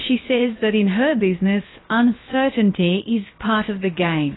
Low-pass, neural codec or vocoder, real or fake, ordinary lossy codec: 7.2 kHz; codec, 16 kHz, about 1 kbps, DyCAST, with the encoder's durations; fake; AAC, 16 kbps